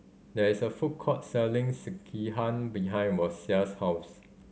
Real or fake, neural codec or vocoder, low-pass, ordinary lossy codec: real; none; none; none